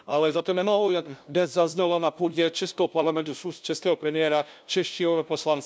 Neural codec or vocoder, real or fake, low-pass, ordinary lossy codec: codec, 16 kHz, 0.5 kbps, FunCodec, trained on LibriTTS, 25 frames a second; fake; none; none